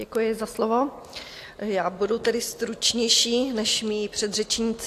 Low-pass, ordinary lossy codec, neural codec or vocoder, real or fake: 14.4 kHz; AAC, 64 kbps; none; real